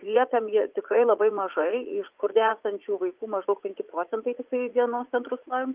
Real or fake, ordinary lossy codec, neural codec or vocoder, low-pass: fake; Opus, 32 kbps; vocoder, 44.1 kHz, 80 mel bands, Vocos; 3.6 kHz